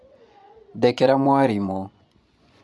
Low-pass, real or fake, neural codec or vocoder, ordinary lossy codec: none; fake; vocoder, 24 kHz, 100 mel bands, Vocos; none